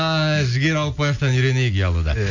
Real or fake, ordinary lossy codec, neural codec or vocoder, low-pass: real; none; none; 7.2 kHz